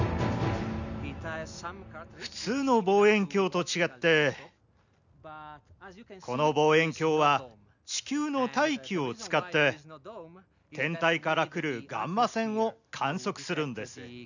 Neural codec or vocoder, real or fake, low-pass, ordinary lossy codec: none; real; 7.2 kHz; none